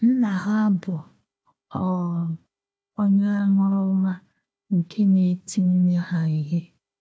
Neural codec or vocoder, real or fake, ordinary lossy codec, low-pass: codec, 16 kHz, 1 kbps, FunCodec, trained on Chinese and English, 50 frames a second; fake; none; none